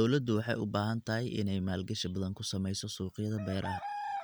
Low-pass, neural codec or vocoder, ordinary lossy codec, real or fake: none; none; none; real